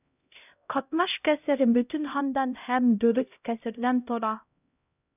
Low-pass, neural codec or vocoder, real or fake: 3.6 kHz; codec, 16 kHz, 0.5 kbps, X-Codec, HuBERT features, trained on LibriSpeech; fake